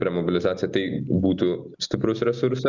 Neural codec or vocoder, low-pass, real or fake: none; 7.2 kHz; real